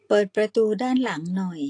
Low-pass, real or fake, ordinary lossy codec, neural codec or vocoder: 10.8 kHz; real; AAC, 48 kbps; none